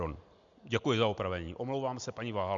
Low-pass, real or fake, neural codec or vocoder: 7.2 kHz; real; none